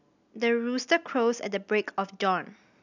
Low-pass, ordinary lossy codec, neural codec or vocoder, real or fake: 7.2 kHz; none; none; real